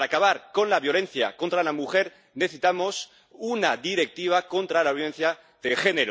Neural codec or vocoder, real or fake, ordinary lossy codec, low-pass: none; real; none; none